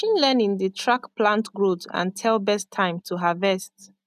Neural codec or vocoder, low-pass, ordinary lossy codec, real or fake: none; 14.4 kHz; none; real